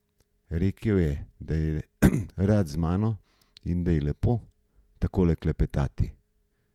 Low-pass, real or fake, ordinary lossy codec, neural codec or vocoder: 19.8 kHz; fake; none; vocoder, 48 kHz, 128 mel bands, Vocos